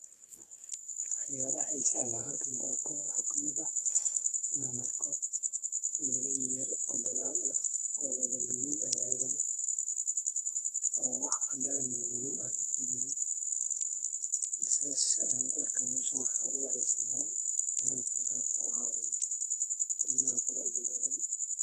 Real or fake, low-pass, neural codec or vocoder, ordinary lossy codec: fake; 14.4 kHz; codec, 44.1 kHz, 2.6 kbps, SNAC; AAC, 48 kbps